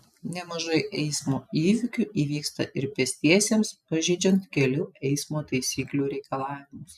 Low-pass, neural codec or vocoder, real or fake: 14.4 kHz; none; real